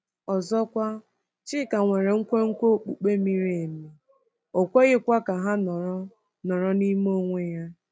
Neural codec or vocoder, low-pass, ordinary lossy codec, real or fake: none; none; none; real